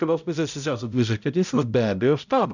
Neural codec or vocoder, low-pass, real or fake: codec, 16 kHz, 0.5 kbps, X-Codec, HuBERT features, trained on balanced general audio; 7.2 kHz; fake